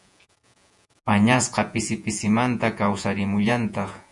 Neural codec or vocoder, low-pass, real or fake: vocoder, 48 kHz, 128 mel bands, Vocos; 10.8 kHz; fake